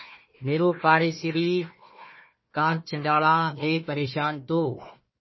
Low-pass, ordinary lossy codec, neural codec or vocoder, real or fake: 7.2 kHz; MP3, 24 kbps; codec, 16 kHz, 1 kbps, FunCodec, trained on Chinese and English, 50 frames a second; fake